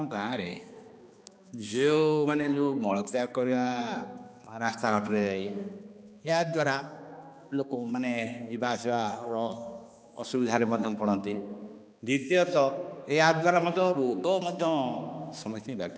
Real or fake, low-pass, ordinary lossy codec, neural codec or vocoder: fake; none; none; codec, 16 kHz, 2 kbps, X-Codec, HuBERT features, trained on balanced general audio